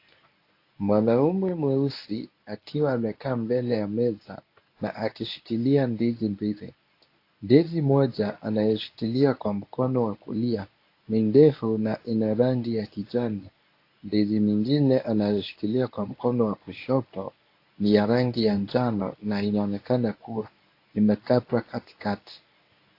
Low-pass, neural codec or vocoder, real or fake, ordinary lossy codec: 5.4 kHz; codec, 24 kHz, 0.9 kbps, WavTokenizer, medium speech release version 1; fake; AAC, 32 kbps